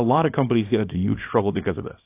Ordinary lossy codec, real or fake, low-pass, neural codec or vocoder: AAC, 24 kbps; fake; 3.6 kHz; codec, 24 kHz, 0.9 kbps, WavTokenizer, small release